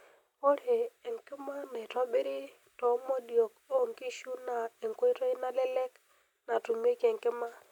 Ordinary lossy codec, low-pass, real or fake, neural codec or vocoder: none; 19.8 kHz; real; none